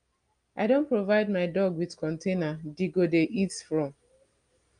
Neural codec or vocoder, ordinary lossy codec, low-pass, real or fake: none; Opus, 32 kbps; 9.9 kHz; real